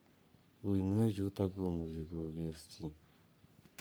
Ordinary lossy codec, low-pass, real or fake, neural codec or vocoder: none; none; fake; codec, 44.1 kHz, 3.4 kbps, Pupu-Codec